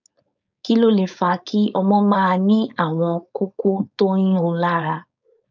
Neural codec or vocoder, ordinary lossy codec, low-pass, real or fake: codec, 16 kHz, 4.8 kbps, FACodec; none; 7.2 kHz; fake